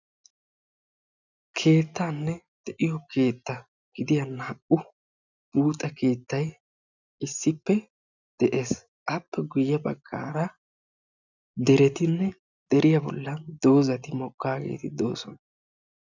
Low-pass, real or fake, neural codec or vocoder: 7.2 kHz; real; none